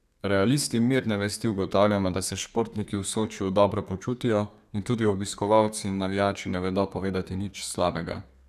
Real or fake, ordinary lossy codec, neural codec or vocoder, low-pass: fake; none; codec, 32 kHz, 1.9 kbps, SNAC; 14.4 kHz